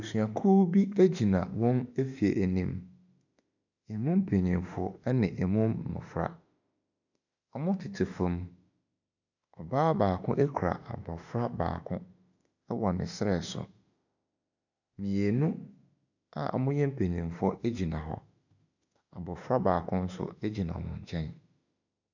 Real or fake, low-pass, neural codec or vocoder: fake; 7.2 kHz; autoencoder, 48 kHz, 32 numbers a frame, DAC-VAE, trained on Japanese speech